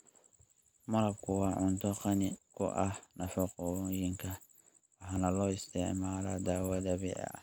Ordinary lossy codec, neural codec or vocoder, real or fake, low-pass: none; none; real; none